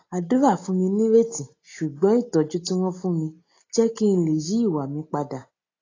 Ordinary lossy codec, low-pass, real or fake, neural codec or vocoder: AAC, 32 kbps; 7.2 kHz; real; none